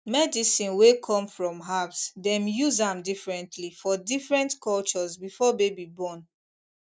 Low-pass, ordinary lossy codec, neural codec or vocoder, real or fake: none; none; none; real